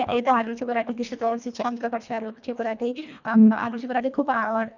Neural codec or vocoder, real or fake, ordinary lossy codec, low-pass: codec, 24 kHz, 1.5 kbps, HILCodec; fake; none; 7.2 kHz